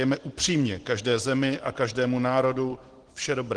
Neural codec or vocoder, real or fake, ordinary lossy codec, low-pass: none; real; Opus, 16 kbps; 9.9 kHz